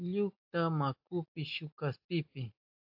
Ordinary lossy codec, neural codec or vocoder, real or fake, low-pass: AAC, 48 kbps; none; real; 5.4 kHz